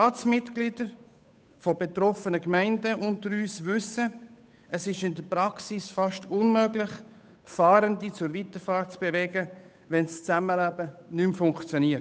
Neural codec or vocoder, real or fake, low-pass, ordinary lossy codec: codec, 16 kHz, 8 kbps, FunCodec, trained on Chinese and English, 25 frames a second; fake; none; none